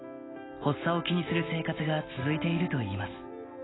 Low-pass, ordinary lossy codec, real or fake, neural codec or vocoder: 7.2 kHz; AAC, 16 kbps; real; none